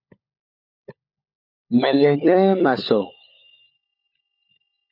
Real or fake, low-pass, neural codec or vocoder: fake; 5.4 kHz; codec, 16 kHz, 16 kbps, FunCodec, trained on LibriTTS, 50 frames a second